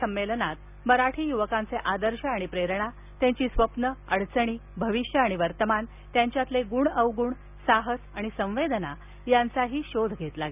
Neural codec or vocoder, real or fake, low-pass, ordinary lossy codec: none; real; 3.6 kHz; none